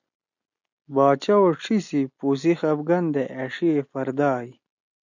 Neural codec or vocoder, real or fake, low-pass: none; real; 7.2 kHz